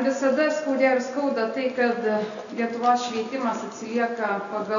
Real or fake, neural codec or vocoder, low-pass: real; none; 7.2 kHz